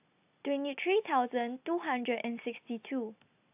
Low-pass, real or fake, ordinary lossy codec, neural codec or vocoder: 3.6 kHz; real; AAC, 32 kbps; none